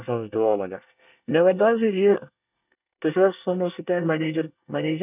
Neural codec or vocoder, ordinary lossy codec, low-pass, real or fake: codec, 24 kHz, 1 kbps, SNAC; none; 3.6 kHz; fake